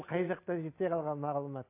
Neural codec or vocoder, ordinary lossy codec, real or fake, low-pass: none; AAC, 24 kbps; real; 3.6 kHz